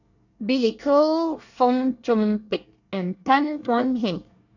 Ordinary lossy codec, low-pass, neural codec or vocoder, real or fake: none; 7.2 kHz; codec, 24 kHz, 1 kbps, SNAC; fake